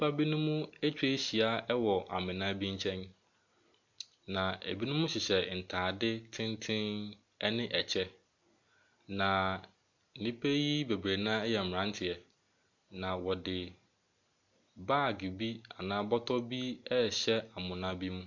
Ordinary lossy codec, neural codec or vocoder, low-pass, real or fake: MP3, 64 kbps; none; 7.2 kHz; real